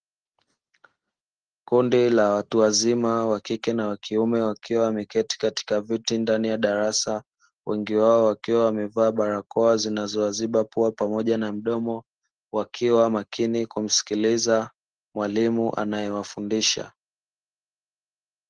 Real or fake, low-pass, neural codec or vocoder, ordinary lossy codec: real; 9.9 kHz; none; Opus, 16 kbps